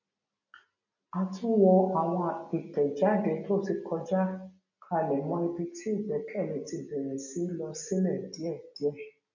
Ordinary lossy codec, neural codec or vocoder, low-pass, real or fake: none; none; 7.2 kHz; real